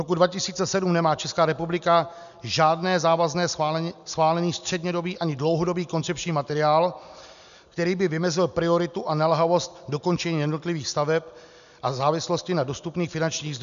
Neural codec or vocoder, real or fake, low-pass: none; real; 7.2 kHz